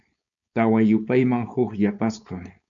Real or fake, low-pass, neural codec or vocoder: fake; 7.2 kHz; codec, 16 kHz, 4.8 kbps, FACodec